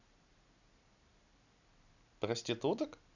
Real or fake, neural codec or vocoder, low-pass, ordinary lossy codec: real; none; 7.2 kHz; none